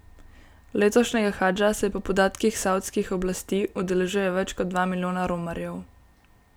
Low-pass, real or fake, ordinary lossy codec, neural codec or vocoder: none; real; none; none